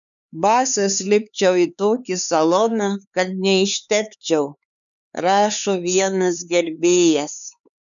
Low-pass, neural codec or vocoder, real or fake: 7.2 kHz; codec, 16 kHz, 4 kbps, X-Codec, HuBERT features, trained on LibriSpeech; fake